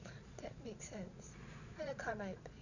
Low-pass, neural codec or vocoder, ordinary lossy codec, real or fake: 7.2 kHz; vocoder, 22.05 kHz, 80 mel bands, Vocos; none; fake